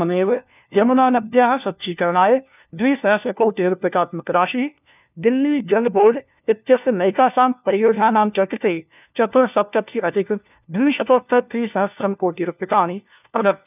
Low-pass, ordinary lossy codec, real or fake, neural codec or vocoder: 3.6 kHz; none; fake; codec, 16 kHz, 1 kbps, FunCodec, trained on LibriTTS, 50 frames a second